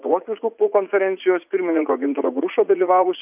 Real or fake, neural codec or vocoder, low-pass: fake; vocoder, 44.1 kHz, 80 mel bands, Vocos; 3.6 kHz